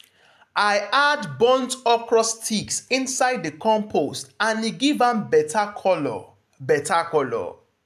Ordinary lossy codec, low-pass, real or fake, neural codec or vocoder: AAC, 96 kbps; 14.4 kHz; real; none